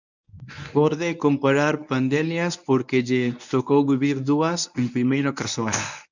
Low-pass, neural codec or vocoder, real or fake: 7.2 kHz; codec, 24 kHz, 0.9 kbps, WavTokenizer, medium speech release version 1; fake